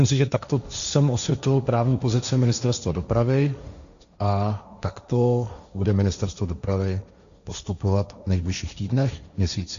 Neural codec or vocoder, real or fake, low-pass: codec, 16 kHz, 1.1 kbps, Voila-Tokenizer; fake; 7.2 kHz